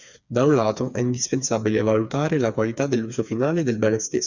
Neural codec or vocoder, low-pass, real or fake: codec, 16 kHz, 4 kbps, FreqCodec, smaller model; 7.2 kHz; fake